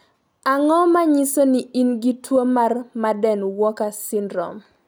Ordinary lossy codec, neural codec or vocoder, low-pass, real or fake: none; none; none; real